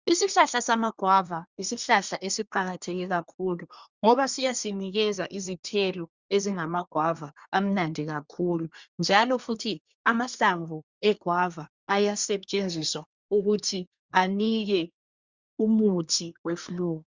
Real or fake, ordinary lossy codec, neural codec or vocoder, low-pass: fake; Opus, 64 kbps; codec, 24 kHz, 1 kbps, SNAC; 7.2 kHz